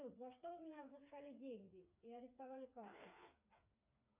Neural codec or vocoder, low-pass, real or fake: codec, 16 kHz, 4 kbps, FreqCodec, smaller model; 3.6 kHz; fake